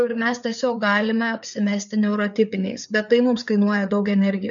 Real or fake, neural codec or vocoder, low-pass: fake; codec, 16 kHz, 4 kbps, FreqCodec, larger model; 7.2 kHz